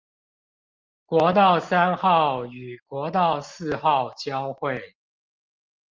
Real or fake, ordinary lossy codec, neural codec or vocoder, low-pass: real; Opus, 32 kbps; none; 7.2 kHz